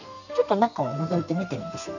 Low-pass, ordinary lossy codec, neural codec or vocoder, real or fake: 7.2 kHz; none; codec, 32 kHz, 1.9 kbps, SNAC; fake